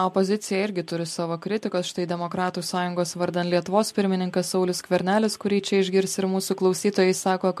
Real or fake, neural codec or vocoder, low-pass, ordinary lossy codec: real; none; 14.4 kHz; MP3, 64 kbps